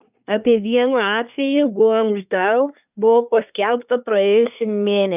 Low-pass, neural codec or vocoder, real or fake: 3.6 kHz; codec, 24 kHz, 1 kbps, SNAC; fake